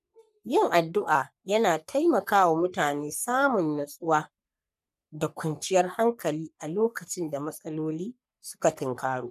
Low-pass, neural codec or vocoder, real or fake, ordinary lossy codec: 14.4 kHz; codec, 44.1 kHz, 3.4 kbps, Pupu-Codec; fake; none